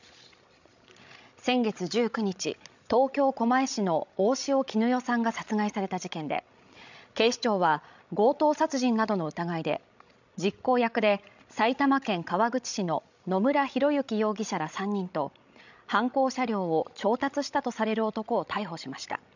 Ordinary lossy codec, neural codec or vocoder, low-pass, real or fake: none; codec, 16 kHz, 16 kbps, FreqCodec, larger model; 7.2 kHz; fake